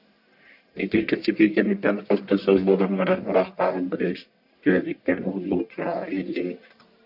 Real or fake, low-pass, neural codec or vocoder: fake; 5.4 kHz; codec, 44.1 kHz, 1.7 kbps, Pupu-Codec